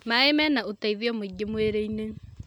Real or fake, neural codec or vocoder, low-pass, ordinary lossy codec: real; none; none; none